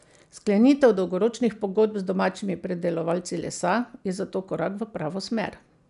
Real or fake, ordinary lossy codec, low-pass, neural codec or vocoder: real; none; 10.8 kHz; none